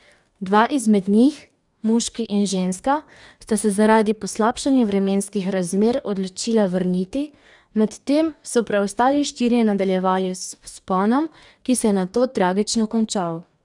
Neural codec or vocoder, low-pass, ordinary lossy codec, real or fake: codec, 44.1 kHz, 2.6 kbps, DAC; 10.8 kHz; none; fake